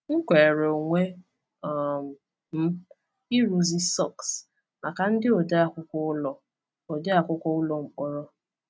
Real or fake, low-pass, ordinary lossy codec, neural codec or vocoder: real; none; none; none